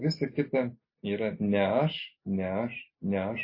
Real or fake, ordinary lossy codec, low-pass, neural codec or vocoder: real; MP3, 24 kbps; 5.4 kHz; none